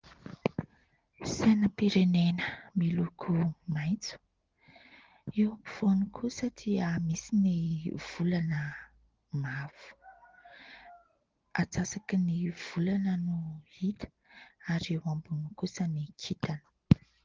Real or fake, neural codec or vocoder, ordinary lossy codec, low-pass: real; none; Opus, 16 kbps; 7.2 kHz